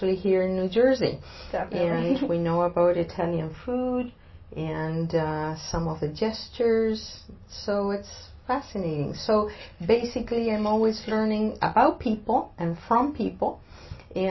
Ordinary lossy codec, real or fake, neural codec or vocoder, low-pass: MP3, 24 kbps; real; none; 7.2 kHz